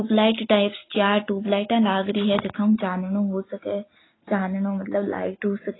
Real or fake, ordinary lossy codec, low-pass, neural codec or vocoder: real; AAC, 16 kbps; 7.2 kHz; none